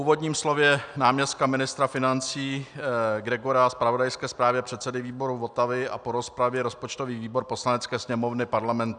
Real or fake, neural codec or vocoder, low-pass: real; none; 9.9 kHz